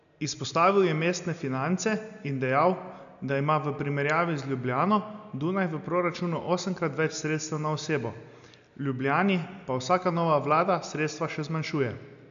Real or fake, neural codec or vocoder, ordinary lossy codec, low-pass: real; none; none; 7.2 kHz